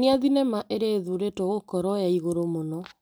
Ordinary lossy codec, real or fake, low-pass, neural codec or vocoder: none; real; none; none